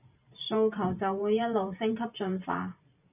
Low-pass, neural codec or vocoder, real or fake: 3.6 kHz; vocoder, 44.1 kHz, 128 mel bands every 512 samples, BigVGAN v2; fake